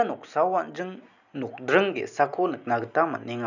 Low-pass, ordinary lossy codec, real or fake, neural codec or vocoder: 7.2 kHz; none; real; none